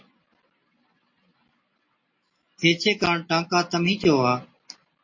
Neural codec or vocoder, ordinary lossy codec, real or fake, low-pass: none; MP3, 32 kbps; real; 7.2 kHz